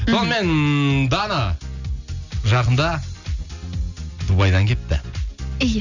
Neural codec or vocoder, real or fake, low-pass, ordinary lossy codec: none; real; 7.2 kHz; none